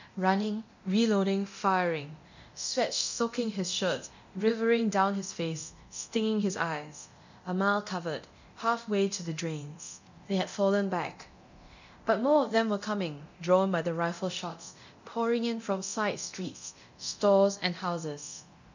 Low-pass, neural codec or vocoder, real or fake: 7.2 kHz; codec, 24 kHz, 0.9 kbps, DualCodec; fake